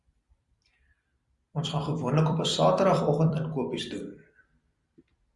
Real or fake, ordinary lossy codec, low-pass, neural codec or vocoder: fake; Opus, 64 kbps; 10.8 kHz; vocoder, 44.1 kHz, 128 mel bands every 256 samples, BigVGAN v2